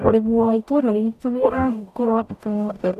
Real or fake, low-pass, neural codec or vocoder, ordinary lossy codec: fake; 14.4 kHz; codec, 44.1 kHz, 0.9 kbps, DAC; none